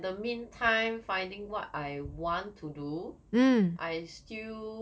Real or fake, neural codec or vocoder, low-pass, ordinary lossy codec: real; none; none; none